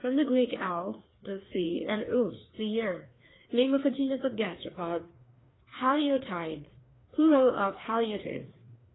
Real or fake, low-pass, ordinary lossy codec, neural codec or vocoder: fake; 7.2 kHz; AAC, 16 kbps; codec, 16 kHz, 2 kbps, FreqCodec, larger model